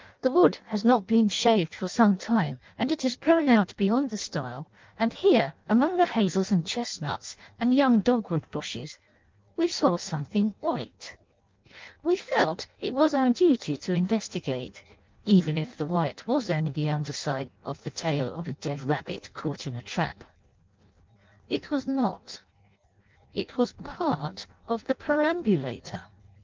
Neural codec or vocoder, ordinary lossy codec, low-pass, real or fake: codec, 16 kHz in and 24 kHz out, 0.6 kbps, FireRedTTS-2 codec; Opus, 24 kbps; 7.2 kHz; fake